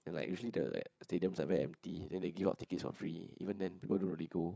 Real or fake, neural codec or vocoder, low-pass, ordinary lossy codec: fake; codec, 16 kHz, 8 kbps, FreqCodec, larger model; none; none